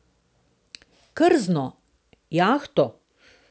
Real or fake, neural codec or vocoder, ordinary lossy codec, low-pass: real; none; none; none